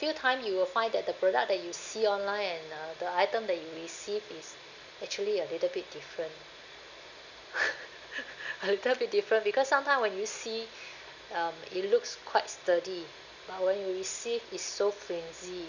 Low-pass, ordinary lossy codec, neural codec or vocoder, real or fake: 7.2 kHz; none; none; real